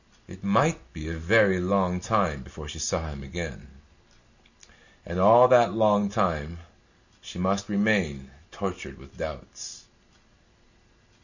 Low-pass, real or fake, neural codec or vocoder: 7.2 kHz; real; none